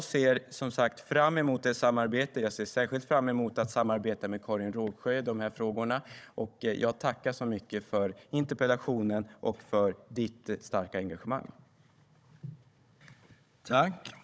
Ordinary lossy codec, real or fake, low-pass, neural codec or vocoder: none; fake; none; codec, 16 kHz, 16 kbps, FunCodec, trained on Chinese and English, 50 frames a second